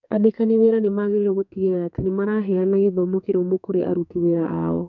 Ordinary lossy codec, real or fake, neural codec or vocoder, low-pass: none; fake; codec, 44.1 kHz, 2.6 kbps, SNAC; 7.2 kHz